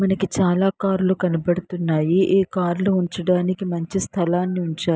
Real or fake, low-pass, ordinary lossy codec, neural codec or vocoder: real; none; none; none